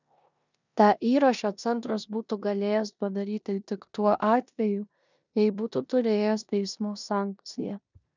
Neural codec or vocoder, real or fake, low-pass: codec, 16 kHz in and 24 kHz out, 0.9 kbps, LongCat-Audio-Codec, four codebook decoder; fake; 7.2 kHz